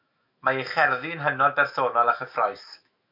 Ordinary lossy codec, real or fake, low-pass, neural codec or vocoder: MP3, 48 kbps; fake; 5.4 kHz; autoencoder, 48 kHz, 128 numbers a frame, DAC-VAE, trained on Japanese speech